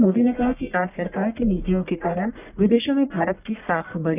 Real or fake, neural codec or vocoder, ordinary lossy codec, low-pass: fake; codec, 44.1 kHz, 1.7 kbps, Pupu-Codec; none; 3.6 kHz